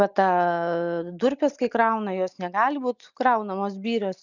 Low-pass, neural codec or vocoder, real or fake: 7.2 kHz; none; real